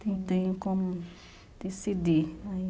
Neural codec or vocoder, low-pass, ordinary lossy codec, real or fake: none; none; none; real